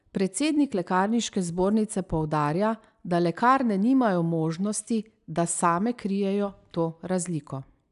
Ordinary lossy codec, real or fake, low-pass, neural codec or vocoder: none; real; 10.8 kHz; none